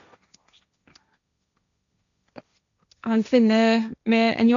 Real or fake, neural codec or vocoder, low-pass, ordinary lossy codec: fake; codec, 16 kHz, 1.1 kbps, Voila-Tokenizer; 7.2 kHz; none